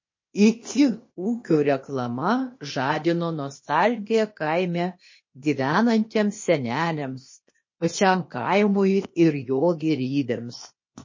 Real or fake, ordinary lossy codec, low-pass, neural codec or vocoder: fake; MP3, 32 kbps; 7.2 kHz; codec, 16 kHz, 0.8 kbps, ZipCodec